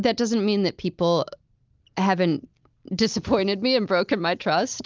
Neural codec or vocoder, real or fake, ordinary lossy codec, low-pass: none; real; Opus, 24 kbps; 7.2 kHz